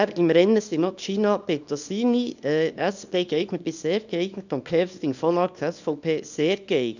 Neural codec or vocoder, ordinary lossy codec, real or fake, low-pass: codec, 24 kHz, 0.9 kbps, WavTokenizer, small release; none; fake; 7.2 kHz